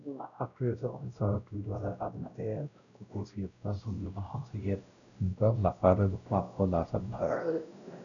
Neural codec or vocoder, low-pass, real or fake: codec, 16 kHz, 0.5 kbps, X-Codec, WavLM features, trained on Multilingual LibriSpeech; 7.2 kHz; fake